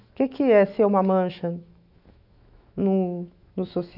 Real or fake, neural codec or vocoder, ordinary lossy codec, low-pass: fake; autoencoder, 48 kHz, 128 numbers a frame, DAC-VAE, trained on Japanese speech; none; 5.4 kHz